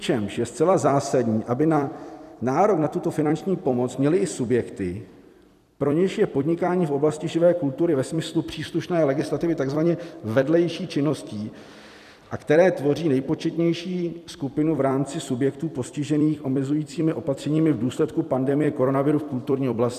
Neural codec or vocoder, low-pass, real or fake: vocoder, 44.1 kHz, 128 mel bands every 256 samples, BigVGAN v2; 14.4 kHz; fake